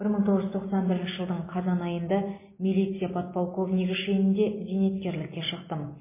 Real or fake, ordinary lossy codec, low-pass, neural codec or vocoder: real; MP3, 16 kbps; 3.6 kHz; none